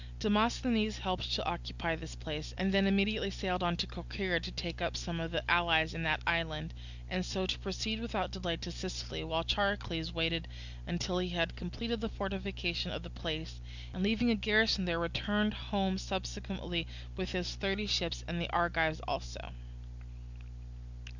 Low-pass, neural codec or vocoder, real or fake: 7.2 kHz; none; real